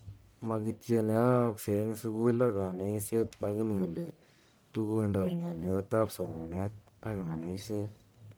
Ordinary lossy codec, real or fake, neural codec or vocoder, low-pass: none; fake; codec, 44.1 kHz, 1.7 kbps, Pupu-Codec; none